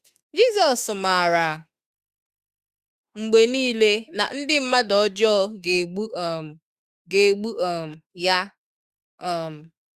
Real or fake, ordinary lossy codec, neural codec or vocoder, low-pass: fake; Opus, 64 kbps; autoencoder, 48 kHz, 32 numbers a frame, DAC-VAE, trained on Japanese speech; 14.4 kHz